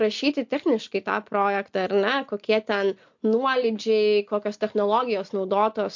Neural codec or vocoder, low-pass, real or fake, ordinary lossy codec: none; 7.2 kHz; real; MP3, 48 kbps